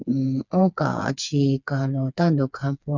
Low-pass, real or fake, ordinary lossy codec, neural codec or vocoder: 7.2 kHz; fake; none; codec, 16 kHz, 4 kbps, FreqCodec, smaller model